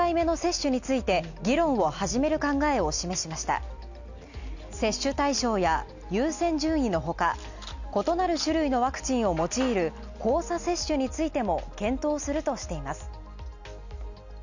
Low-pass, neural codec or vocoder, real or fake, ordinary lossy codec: 7.2 kHz; none; real; none